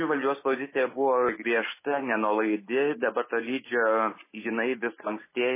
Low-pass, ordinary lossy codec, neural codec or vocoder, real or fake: 3.6 kHz; MP3, 16 kbps; none; real